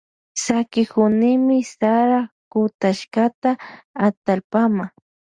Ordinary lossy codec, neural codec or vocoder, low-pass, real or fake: Opus, 64 kbps; none; 9.9 kHz; real